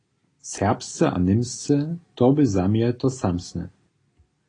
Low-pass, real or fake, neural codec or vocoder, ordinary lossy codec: 9.9 kHz; real; none; AAC, 32 kbps